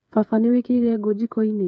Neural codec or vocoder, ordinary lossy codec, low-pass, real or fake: codec, 16 kHz, 8 kbps, FreqCodec, smaller model; none; none; fake